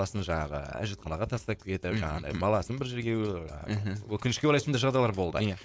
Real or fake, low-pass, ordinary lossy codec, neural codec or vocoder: fake; none; none; codec, 16 kHz, 4.8 kbps, FACodec